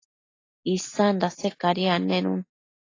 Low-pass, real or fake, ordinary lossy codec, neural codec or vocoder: 7.2 kHz; real; AAC, 32 kbps; none